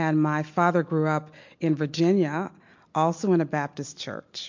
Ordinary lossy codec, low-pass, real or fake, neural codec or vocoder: MP3, 48 kbps; 7.2 kHz; real; none